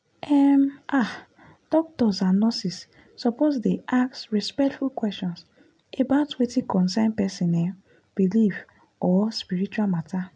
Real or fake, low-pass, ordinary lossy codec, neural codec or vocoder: real; 9.9 kHz; MP3, 64 kbps; none